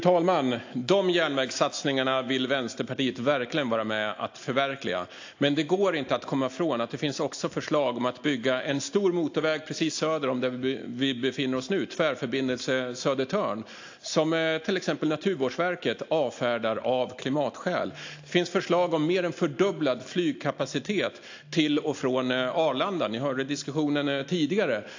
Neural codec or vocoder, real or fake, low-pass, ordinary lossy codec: none; real; 7.2 kHz; AAC, 48 kbps